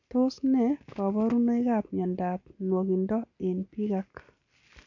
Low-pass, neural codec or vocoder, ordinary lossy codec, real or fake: 7.2 kHz; none; none; real